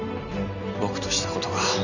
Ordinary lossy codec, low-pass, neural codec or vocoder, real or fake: none; 7.2 kHz; none; real